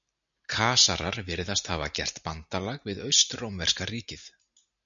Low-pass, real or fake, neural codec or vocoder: 7.2 kHz; real; none